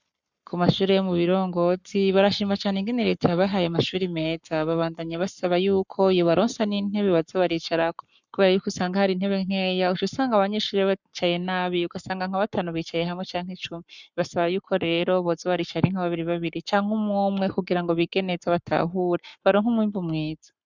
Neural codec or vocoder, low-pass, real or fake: codec, 44.1 kHz, 7.8 kbps, Pupu-Codec; 7.2 kHz; fake